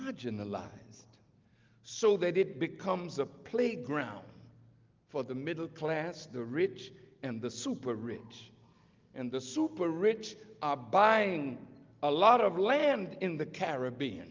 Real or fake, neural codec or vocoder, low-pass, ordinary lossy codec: real; none; 7.2 kHz; Opus, 24 kbps